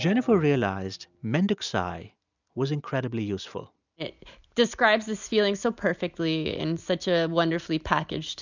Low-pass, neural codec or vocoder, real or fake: 7.2 kHz; none; real